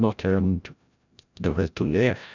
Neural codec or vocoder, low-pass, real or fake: codec, 16 kHz, 0.5 kbps, FreqCodec, larger model; 7.2 kHz; fake